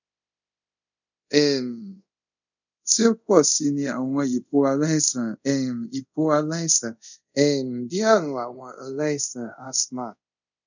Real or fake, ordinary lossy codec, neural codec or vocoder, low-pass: fake; none; codec, 24 kHz, 0.5 kbps, DualCodec; 7.2 kHz